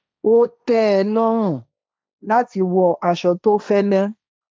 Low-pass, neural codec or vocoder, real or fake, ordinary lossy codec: none; codec, 16 kHz, 1.1 kbps, Voila-Tokenizer; fake; none